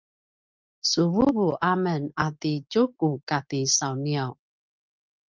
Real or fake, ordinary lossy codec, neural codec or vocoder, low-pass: real; Opus, 32 kbps; none; 7.2 kHz